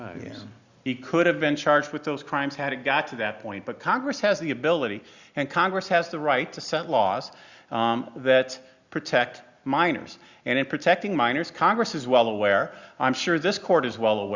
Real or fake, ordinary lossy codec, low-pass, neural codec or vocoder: real; Opus, 64 kbps; 7.2 kHz; none